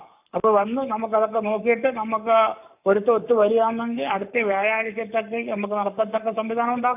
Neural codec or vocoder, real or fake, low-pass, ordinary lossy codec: codec, 44.1 kHz, 7.8 kbps, Pupu-Codec; fake; 3.6 kHz; AAC, 32 kbps